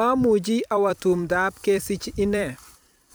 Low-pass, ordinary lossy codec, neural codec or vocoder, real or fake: none; none; vocoder, 44.1 kHz, 128 mel bands every 256 samples, BigVGAN v2; fake